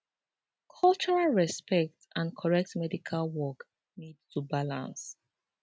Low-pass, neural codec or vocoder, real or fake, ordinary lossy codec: none; none; real; none